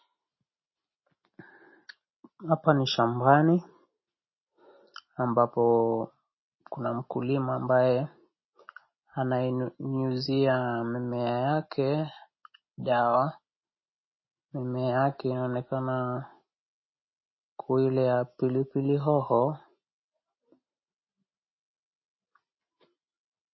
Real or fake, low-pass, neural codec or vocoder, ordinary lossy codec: real; 7.2 kHz; none; MP3, 24 kbps